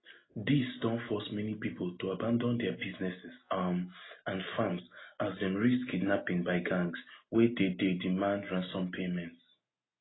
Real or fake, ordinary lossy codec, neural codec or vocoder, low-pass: real; AAC, 16 kbps; none; 7.2 kHz